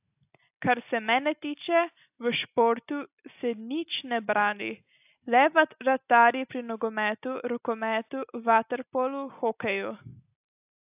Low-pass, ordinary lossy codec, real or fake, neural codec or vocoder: 3.6 kHz; AAC, 32 kbps; real; none